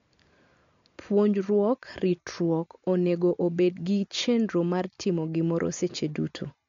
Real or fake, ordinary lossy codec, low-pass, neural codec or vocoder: real; MP3, 48 kbps; 7.2 kHz; none